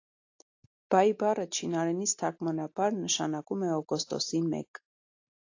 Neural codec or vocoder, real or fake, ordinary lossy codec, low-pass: none; real; AAC, 48 kbps; 7.2 kHz